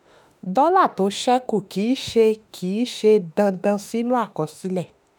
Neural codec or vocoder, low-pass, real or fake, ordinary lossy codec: autoencoder, 48 kHz, 32 numbers a frame, DAC-VAE, trained on Japanese speech; none; fake; none